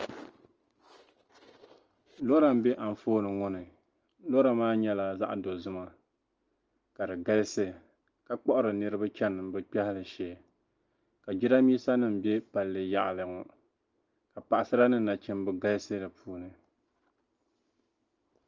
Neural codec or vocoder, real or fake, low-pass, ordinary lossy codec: none; real; 7.2 kHz; Opus, 24 kbps